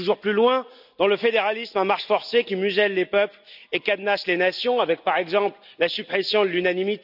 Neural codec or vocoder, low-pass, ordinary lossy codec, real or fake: none; 5.4 kHz; none; real